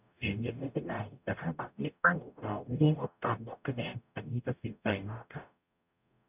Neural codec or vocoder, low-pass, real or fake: codec, 44.1 kHz, 0.9 kbps, DAC; 3.6 kHz; fake